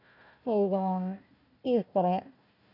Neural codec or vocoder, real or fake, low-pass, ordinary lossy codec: codec, 16 kHz, 1 kbps, FunCodec, trained on Chinese and English, 50 frames a second; fake; 5.4 kHz; none